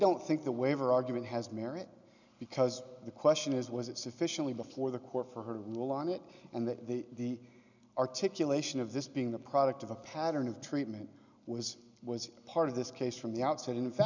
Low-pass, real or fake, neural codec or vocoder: 7.2 kHz; real; none